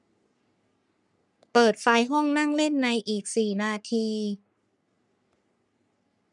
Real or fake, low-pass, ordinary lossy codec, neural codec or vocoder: fake; 10.8 kHz; none; codec, 44.1 kHz, 3.4 kbps, Pupu-Codec